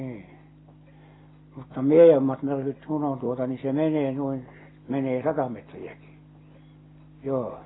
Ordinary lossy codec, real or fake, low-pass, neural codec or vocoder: AAC, 16 kbps; real; 7.2 kHz; none